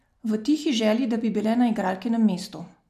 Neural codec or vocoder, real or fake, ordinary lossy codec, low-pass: none; real; none; 14.4 kHz